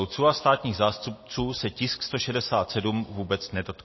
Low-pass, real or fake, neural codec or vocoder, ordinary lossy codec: 7.2 kHz; real; none; MP3, 24 kbps